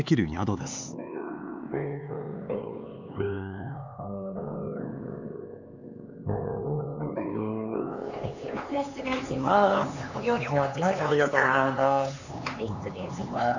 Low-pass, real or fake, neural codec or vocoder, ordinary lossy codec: 7.2 kHz; fake; codec, 16 kHz, 4 kbps, X-Codec, HuBERT features, trained on LibriSpeech; none